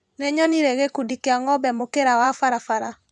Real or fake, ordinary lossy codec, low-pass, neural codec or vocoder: fake; none; none; vocoder, 24 kHz, 100 mel bands, Vocos